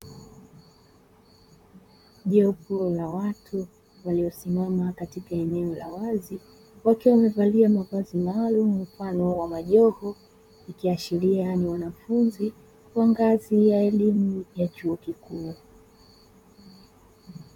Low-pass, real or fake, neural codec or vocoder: 19.8 kHz; fake; vocoder, 44.1 kHz, 128 mel bands, Pupu-Vocoder